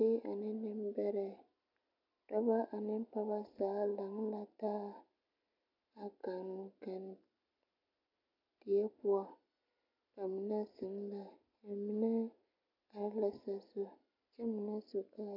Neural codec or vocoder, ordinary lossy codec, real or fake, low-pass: none; MP3, 48 kbps; real; 5.4 kHz